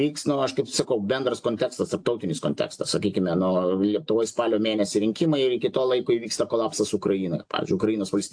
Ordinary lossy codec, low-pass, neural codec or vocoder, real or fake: AAC, 64 kbps; 9.9 kHz; none; real